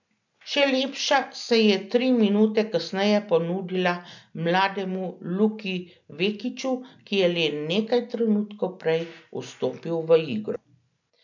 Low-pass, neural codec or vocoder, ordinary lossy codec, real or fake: 7.2 kHz; none; none; real